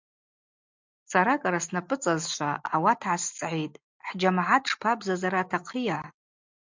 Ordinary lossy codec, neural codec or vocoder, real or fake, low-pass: MP3, 64 kbps; none; real; 7.2 kHz